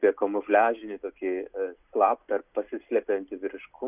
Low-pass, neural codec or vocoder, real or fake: 3.6 kHz; none; real